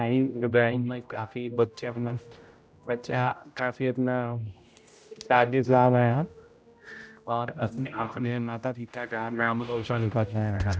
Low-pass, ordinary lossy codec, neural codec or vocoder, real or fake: none; none; codec, 16 kHz, 0.5 kbps, X-Codec, HuBERT features, trained on general audio; fake